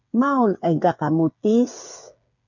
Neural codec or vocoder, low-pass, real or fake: codec, 16 kHz, 8 kbps, FreqCodec, smaller model; 7.2 kHz; fake